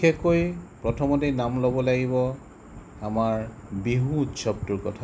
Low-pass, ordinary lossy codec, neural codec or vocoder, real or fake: none; none; none; real